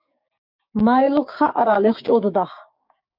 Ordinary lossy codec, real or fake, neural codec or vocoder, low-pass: MP3, 48 kbps; fake; codec, 16 kHz, 6 kbps, DAC; 5.4 kHz